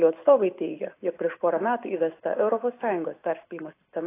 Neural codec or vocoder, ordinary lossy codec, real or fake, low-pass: none; AAC, 24 kbps; real; 3.6 kHz